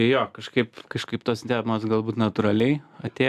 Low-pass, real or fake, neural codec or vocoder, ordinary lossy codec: 14.4 kHz; real; none; AAC, 96 kbps